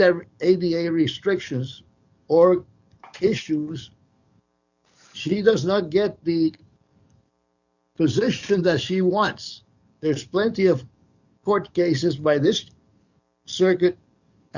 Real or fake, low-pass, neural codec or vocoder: fake; 7.2 kHz; codec, 44.1 kHz, 7.8 kbps, DAC